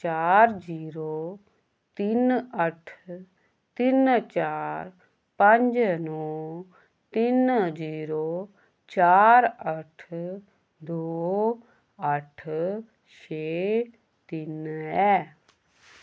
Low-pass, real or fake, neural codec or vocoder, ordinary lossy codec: none; real; none; none